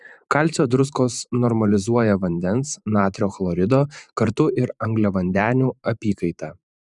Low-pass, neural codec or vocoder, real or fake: 10.8 kHz; vocoder, 44.1 kHz, 128 mel bands every 512 samples, BigVGAN v2; fake